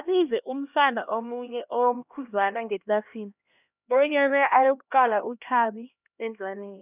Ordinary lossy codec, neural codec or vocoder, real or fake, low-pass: none; codec, 16 kHz, 1 kbps, X-Codec, HuBERT features, trained on LibriSpeech; fake; 3.6 kHz